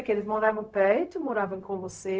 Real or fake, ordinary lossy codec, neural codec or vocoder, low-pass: fake; none; codec, 16 kHz, 0.4 kbps, LongCat-Audio-Codec; none